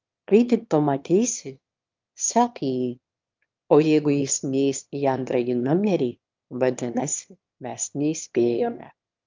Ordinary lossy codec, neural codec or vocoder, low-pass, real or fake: Opus, 24 kbps; autoencoder, 22.05 kHz, a latent of 192 numbers a frame, VITS, trained on one speaker; 7.2 kHz; fake